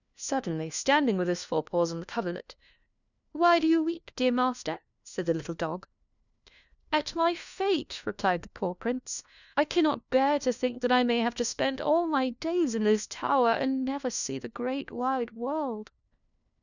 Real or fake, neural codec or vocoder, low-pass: fake; codec, 16 kHz, 1 kbps, FunCodec, trained on LibriTTS, 50 frames a second; 7.2 kHz